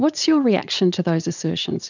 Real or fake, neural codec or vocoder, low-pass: fake; vocoder, 44.1 kHz, 80 mel bands, Vocos; 7.2 kHz